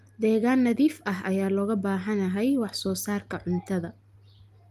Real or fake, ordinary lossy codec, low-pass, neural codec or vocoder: real; Opus, 32 kbps; 14.4 kHz; none